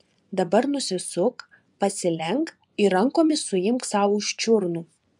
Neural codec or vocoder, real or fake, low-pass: vocoder, 24 kHz, 100 mel bands, Vocos; fake; 10.8 kHz